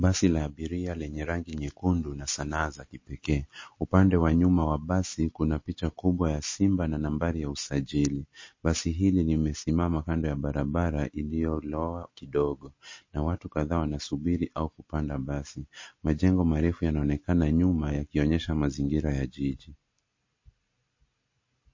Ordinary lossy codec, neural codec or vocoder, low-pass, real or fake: MP3, 32 kbps; none; 7.2 kHz; real